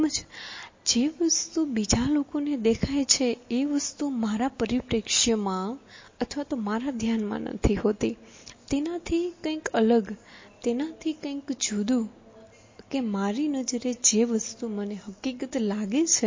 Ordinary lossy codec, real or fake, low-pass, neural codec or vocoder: MP3, 32 kbps; real; 7.2 kHz; none